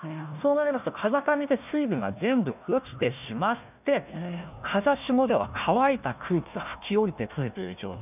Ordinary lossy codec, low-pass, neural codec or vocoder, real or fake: none; 3.6 kHz; codec, 16 kHz, 1 kbps, FunCodec, trained on Chinese and English, 50 frames a second; fake